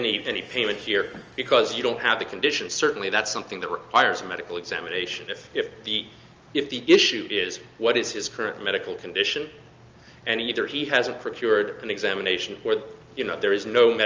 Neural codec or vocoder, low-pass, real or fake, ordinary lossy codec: none; 7.2 kHz; real; Opus, 24 kbps